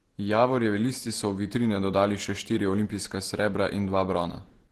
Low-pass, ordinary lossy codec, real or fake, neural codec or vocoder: 14.4 kHz; Opus, 16 kbps; fake; vocoder, 48 kHz, 128 mel bands, Vocos